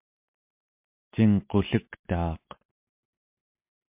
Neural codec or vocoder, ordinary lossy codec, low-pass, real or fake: none; MP3, 32 kbps; 3.6 kHz; real